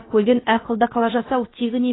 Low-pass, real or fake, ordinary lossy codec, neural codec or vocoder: 7.2 kHz; fake; AAC, 16 kbps; codec, 16 kHz, about 1 kbps, DyCAST, with the encoder's durations